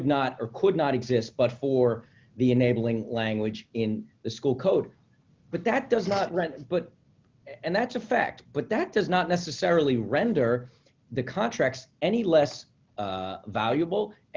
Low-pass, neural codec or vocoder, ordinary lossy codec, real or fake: 7.2 kHz; none; Opus, 24 kbps; real